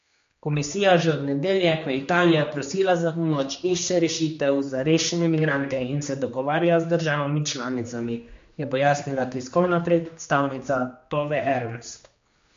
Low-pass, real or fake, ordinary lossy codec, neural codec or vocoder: 7.2 kHz; fake; MP3, 48 kbps; codec, 16 kHz, 2 kbps, X-Codec, HuBERT features, trained on general audio